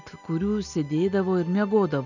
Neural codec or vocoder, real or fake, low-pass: none; real; 7.2 kHz